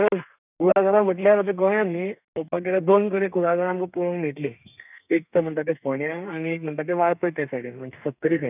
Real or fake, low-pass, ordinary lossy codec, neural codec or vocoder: fake; 3.6 kHz; none; codec, 32 kHz, 1.9 kbps, SNAC